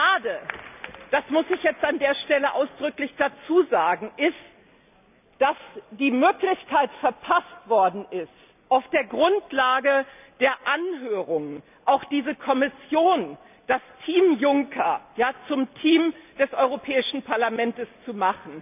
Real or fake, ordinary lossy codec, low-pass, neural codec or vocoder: real; none; 3.6 kHz; none